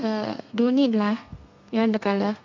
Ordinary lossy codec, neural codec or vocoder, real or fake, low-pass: MP3, 64 kbps; codec, 32 kHz, 1.9 kbps, SNAC; fake; 7.2 kHz